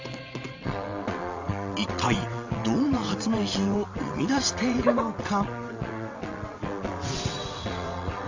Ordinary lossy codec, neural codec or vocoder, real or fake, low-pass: AAC, 48 kbps; vocoder, 22.05 kHz, 80 mel bands, WaveNeXt; fake; 7.2 kHz